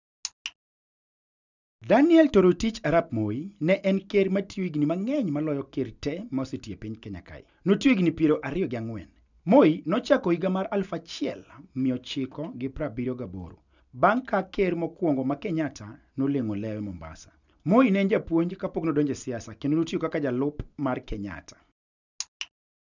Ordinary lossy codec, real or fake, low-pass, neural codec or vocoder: none; real; 7.2 kHz; none